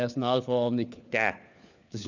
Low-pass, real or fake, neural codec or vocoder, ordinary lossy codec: 7.2 kHz; fake; codec, 16 kHz, 4 kbps, FunCodec, trained on LibriTTS, 50 frames a second; none